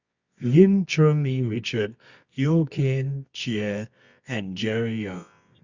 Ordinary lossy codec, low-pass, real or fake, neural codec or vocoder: Opus, 64 kbps; 7.2 kHz; fake; codec, 24 kHz, 0.9 kbps, WavTokenizer, medium music audio release